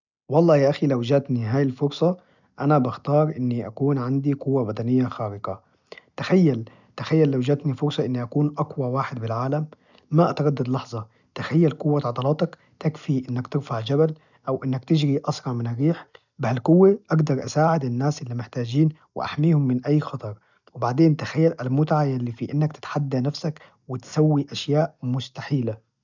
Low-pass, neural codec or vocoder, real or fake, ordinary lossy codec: 7.2 kHz; none; real; none